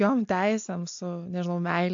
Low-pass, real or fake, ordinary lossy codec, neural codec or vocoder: 7.2 kHz; real; AAC, 64 kbps; none